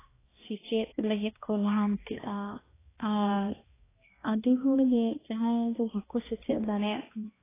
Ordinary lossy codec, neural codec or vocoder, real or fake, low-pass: AAC, 16 kbps; codec, 16 kHz, 1 kbps, X-Codec, HuBERT features, trained on balanced general audio; fake; 3.6 kHz